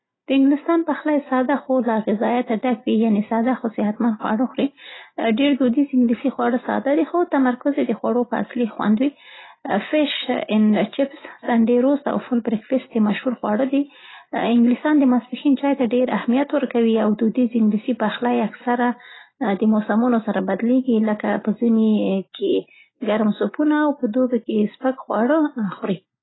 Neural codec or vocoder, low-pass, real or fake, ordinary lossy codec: none; 7.2 kHz; real; AAC, 16 kbps